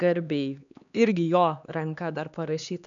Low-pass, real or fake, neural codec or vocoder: 7.2 kHz; fake; codec, 16 kHz, 2 kbps, X-Codec, HuBERT features, trained on LibriSpeech